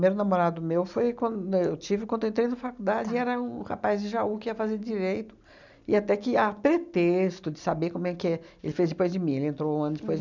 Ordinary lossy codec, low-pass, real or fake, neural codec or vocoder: none; 7.2 kHz; real; none